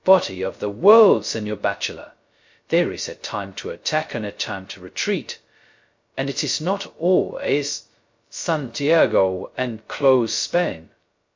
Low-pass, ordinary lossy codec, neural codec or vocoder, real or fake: 7.2 kHz; MP3, 48 kbps; codec, 16 kHz, 0.2 kbps, FocalCodec; fake